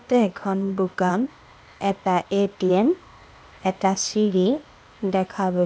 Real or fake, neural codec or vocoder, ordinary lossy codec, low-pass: fake; codec, 16 kHz, 0.8 kbps, ZipCodec; none; none